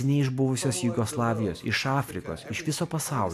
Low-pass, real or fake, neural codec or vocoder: 14.4 kHz; real; none